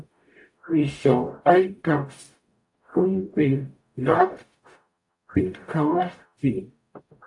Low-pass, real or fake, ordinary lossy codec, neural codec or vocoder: 10.8 kHz; fake; AAC, 64 kbps; codec, 44.1 kHz, 0.9 kbps, DAC